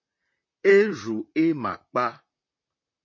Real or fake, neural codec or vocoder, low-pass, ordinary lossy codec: fake; vocoder, 44.1 kHz, 128 mel bands, Pupu-Vocoder; 7.2 kHz; MP3, 32 kbps